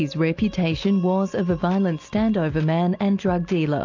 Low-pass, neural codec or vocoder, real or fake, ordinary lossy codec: 7.2 kHz; none; real; AAC, 48 kbps